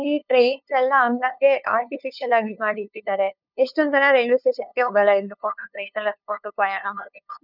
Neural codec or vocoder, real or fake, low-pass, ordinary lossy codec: codec, 16 kHz, 2 kbps, FunCodec, trained on LibriTTS, 25 frames a second; fake; 5.4 kHz; none